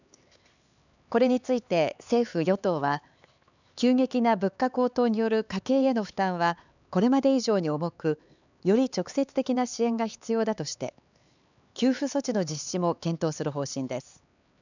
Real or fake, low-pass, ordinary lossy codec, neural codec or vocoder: fake; 7.2 kHz; none; codec, 16 kHz, 4 kbps, X-Codec, HuBERT features, trained on LibriSpeech